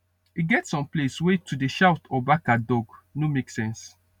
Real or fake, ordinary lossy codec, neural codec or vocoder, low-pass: real; none; none; 19.8 kHz